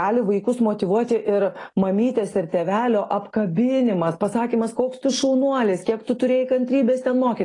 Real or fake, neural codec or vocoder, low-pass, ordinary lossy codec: real; none; 10.8 kHz; AAC, 32 kbps